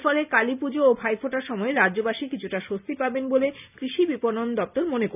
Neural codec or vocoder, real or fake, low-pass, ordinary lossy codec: none; real; 3.6 kHz; none